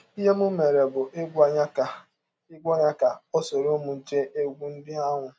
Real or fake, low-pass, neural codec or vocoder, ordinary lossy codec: real; none; none; none